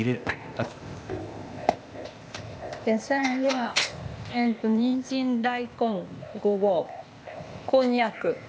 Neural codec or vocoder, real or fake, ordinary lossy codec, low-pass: codec, 16 kHz, 0.8 kbps, ZipCodec; fake; none; none